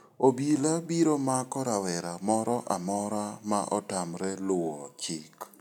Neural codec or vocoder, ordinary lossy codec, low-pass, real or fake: none; none; 19.8 kHz; real